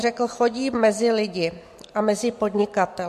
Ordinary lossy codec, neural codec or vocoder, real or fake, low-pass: MP3, 64 kbps; none; real; 14.4 kHz